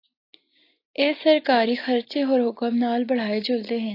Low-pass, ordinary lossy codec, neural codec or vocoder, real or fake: 5.4 kHz; AAC, 32 kbps; none; real